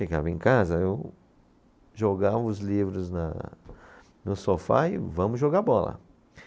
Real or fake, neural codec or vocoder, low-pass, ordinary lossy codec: real; none; none; none